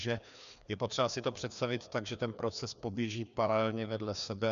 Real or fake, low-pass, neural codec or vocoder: fake; 7.2 kHz; codec, 16 kHz, 2 kbps, FreqCodec, larger model